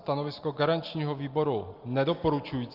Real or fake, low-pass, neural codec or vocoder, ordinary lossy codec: real; 5.4 kHz; none; Opus, 24 kbps